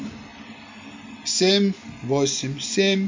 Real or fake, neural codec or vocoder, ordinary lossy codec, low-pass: real; none; MP3, 48 kbps; 7.2 kHz